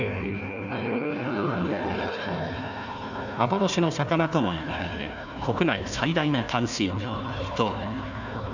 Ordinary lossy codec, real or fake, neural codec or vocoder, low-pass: none; fake; codec, 16 kHz, 1 kbps, FunCodec, trained on Chinese and English, 50 frames a second; 7.2 kHz